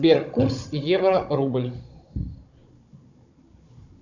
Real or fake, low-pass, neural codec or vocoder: fake; 7.2 kHz; codec, 16 kHz, 16 kbps, FunCodec, trained on Chinese and English, 50 frames a second